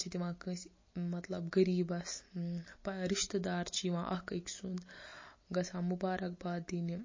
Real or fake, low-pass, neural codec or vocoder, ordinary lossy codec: real; 7.2 kHz; none; MP3, 32 kbps